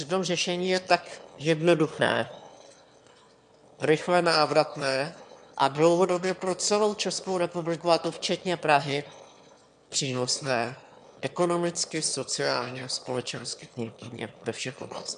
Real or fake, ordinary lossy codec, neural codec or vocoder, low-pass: fake; AAC, 96 kbps; autoencoder, 22.05 kHz, a latent of 192 numbers a frame, VITS, trained on one speaker; 9.9 kHz